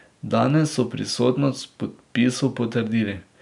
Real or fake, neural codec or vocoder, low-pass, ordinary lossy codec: real; none; 10.8 kHz; none